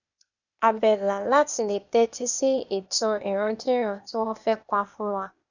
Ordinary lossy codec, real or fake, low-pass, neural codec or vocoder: none; fake; 7.2 kHz; codec, 16 kHz, 0.8 kbps, ZipCodec